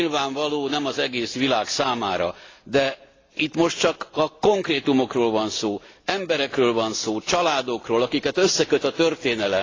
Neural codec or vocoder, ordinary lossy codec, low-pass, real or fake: none; AAC, 32 kbps; 7.2 kHz; real